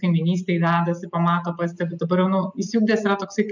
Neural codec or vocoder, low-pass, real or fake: none; 7.2 kHz; real